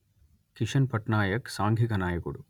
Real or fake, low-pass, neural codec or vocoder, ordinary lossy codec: real; 19.8 kHz; none; none